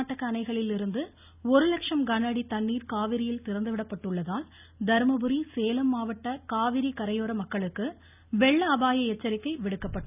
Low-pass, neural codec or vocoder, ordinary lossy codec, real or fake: 3.6 kHz; none; none; real